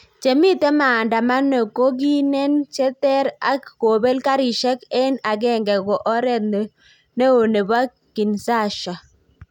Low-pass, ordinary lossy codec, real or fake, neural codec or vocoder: 19.8 kHz; none; real; none